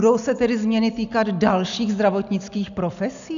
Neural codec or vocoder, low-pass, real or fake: none; 7.2 kHz; real